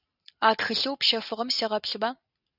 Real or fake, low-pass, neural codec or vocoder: real; 5.4 kHz; none